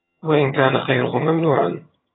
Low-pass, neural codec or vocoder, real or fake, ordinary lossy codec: 7.2 kHz; vocoder, 22.05 kHz, 80 mel bands, HiFi-GAN; fake; AAC, 16 kbps